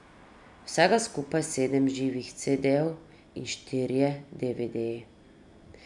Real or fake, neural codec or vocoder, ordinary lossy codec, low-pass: real; none; none; 10.8 kHz